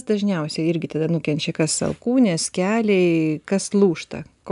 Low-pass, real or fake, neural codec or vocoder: 10.8 kHz; real; none